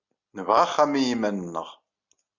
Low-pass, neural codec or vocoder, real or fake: 7.2 kHz; none; real